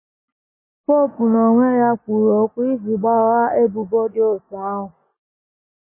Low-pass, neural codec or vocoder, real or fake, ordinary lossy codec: 3.6 kHz; none; real; MP3, 16 kbps